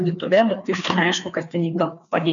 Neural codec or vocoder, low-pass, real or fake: codec, 16 kHz, 2 kbps, FreqCodec, larger model; 7.2 kHz; fake